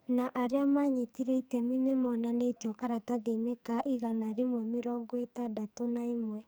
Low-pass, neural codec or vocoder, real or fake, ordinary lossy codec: none; codec, 44.1 kHz, 2.6 kbps, SNAC; fake; none